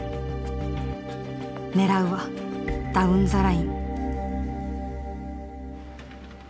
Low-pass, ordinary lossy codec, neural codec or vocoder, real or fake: none; none; none; real